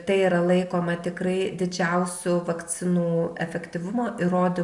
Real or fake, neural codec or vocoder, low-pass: real; none; 10.8 kHz